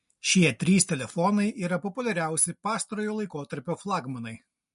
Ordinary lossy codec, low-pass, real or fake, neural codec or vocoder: MP3, 48 kbps; 10.8 kHz; real; none